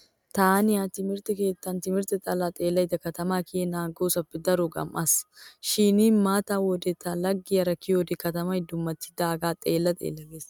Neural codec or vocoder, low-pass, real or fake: none; 19.8 kHz; real